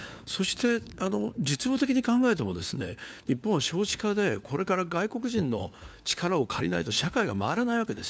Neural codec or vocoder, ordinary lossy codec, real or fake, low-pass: codec, 16 kHz, 4 kbps, FunCodec, trained on LibriTTS, 50 frames a second; none; fake; none